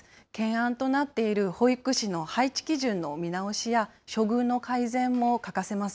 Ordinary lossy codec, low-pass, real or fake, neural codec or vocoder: none; none; real; none